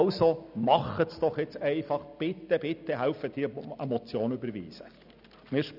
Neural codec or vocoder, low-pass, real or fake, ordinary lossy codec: none; 5.4 kHz; real; none